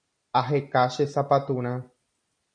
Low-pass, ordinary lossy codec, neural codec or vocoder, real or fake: 9.9 kHz; MP3, 96 kbps; none; real